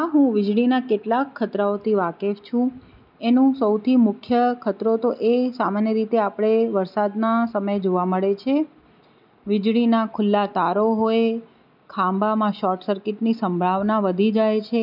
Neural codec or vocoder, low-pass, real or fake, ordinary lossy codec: none; 5.4 kHz; real; none